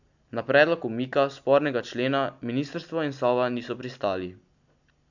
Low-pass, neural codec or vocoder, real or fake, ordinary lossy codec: 7.2 kHz; none; real; none